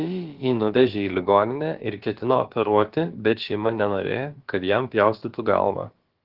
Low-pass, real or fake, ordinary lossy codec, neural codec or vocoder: 5.4 kHz; fake; Opus, 16 kbps; codec, 16 kHz, about 1 kbps, DyCAST, with the encoder's durations